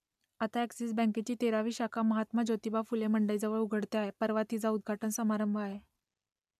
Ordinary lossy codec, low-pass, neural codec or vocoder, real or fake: none; 14.4 kHz; none; real